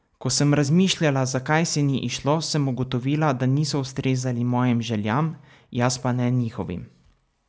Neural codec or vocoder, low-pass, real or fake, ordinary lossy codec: none; none; real; none